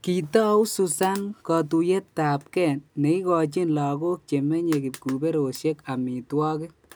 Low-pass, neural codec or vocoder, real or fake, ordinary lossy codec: none; none; real; none